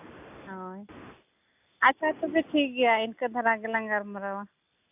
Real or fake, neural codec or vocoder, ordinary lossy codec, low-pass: real; none; AAC, 32 kbps; 3.6 kHz